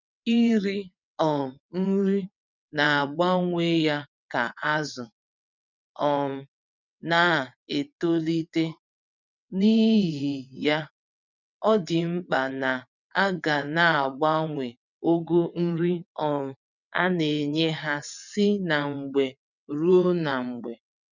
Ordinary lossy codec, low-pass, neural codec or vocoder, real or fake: none; 7.2 kHz; vocoder, 22.05 kHz, 80 mel bands, WaveNeXt; fake